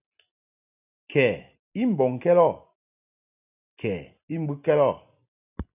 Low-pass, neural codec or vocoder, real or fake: 3.6 kHz; none; real